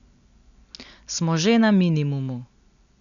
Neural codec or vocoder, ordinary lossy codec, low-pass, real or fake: none; MP3, 96 kbps; 7.2 kHz; real